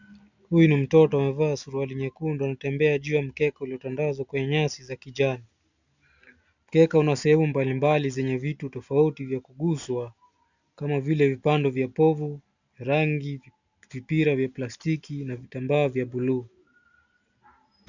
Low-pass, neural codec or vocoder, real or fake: 7.2 kHz; none; real